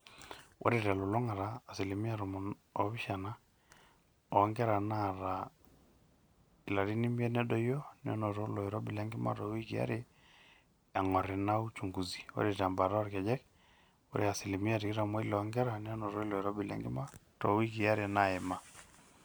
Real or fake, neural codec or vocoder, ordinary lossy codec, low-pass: real; none; none; none